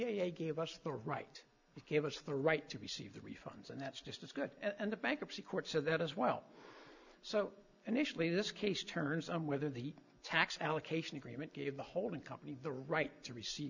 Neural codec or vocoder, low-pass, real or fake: none; 7.2 kHz; real